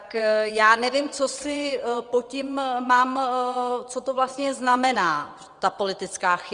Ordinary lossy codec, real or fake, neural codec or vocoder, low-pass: Opus, 32 kbps; fake; vocoder, 22.05 kHz, 80 mel bands, WaveNeXt; 9.9 kHz